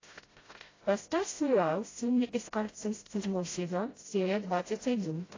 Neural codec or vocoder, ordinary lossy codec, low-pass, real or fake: codec, 16 kHz, 0.5 kbps, FreqCodec, smaller model; AAC, 32 kbps; 7.2 kHz; fake